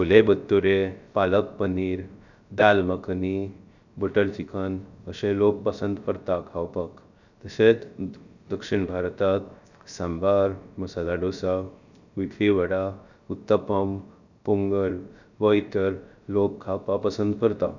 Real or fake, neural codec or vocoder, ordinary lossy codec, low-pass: fake; codec, 16 kHz, 0.3 kbps, FocalCodec; none; 7.2 kHz